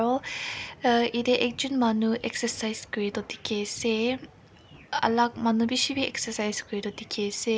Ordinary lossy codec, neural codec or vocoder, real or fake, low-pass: none; none; real; none